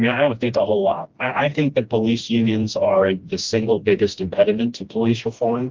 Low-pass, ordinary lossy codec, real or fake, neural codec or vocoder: 7.2 kHz; Opus, 32 kbps; fake; codec, 16 kHz, 1 kbps, FreqCodec, smaller model